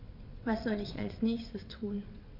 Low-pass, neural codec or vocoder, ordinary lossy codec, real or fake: 5.4 kHz; vocoder, 22.05 kHz, 80 mel bands, WaveNeXt; none; fake